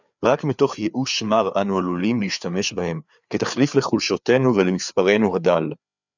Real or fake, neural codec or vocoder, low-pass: fake; codec, 16 kHz, 4 kbps, FreqCodec, larger model; 7.2 kHz